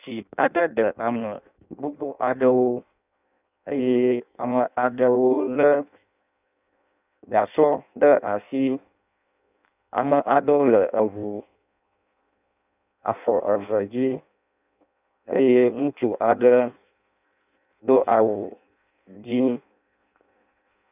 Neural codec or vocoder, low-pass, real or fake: codec, 16 kHz in and 24 kHz out, 0.6 kbps, FireRedTTS-2 codec; 3.6 kHz; fake